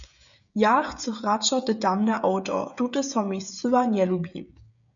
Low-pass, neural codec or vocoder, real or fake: 7.2 kHz; codec, 16 kHz, 16 kbps, FreqCodec, smaller model; fake